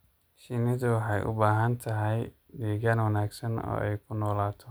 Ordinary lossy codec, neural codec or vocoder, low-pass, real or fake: none; none; none; real